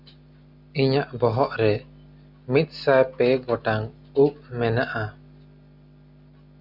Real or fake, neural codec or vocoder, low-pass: real; none; 5.4 kHz